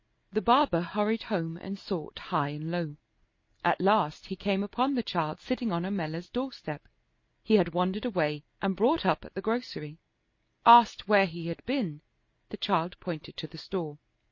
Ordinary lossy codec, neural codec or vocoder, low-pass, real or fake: MP3, 32 kbps; none; 7.2 kHz; real